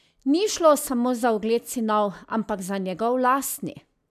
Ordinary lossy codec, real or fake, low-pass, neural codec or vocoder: none; real; 14.4 kHz; none